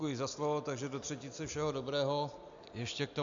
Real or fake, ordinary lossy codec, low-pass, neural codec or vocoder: real; AAC, 64 kbps; 7.2 kHz; none